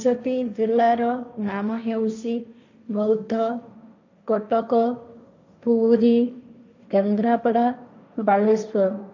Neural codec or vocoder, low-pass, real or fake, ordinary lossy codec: codec, 16 kHz, 1.1 kbps, Voila-Tokenizer; 7.2 kHz; fake; none